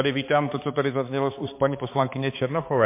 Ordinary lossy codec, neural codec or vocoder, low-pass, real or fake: MP3, 24 kbps; codec, 16 kHz, 4 kbps, X-Codec, HuBERT features, trained on balanced general audio; 3.6 kHz; fake